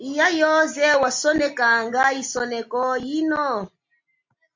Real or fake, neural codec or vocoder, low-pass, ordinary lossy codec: real; none; 7.2 kHz; MP3, 48 kbps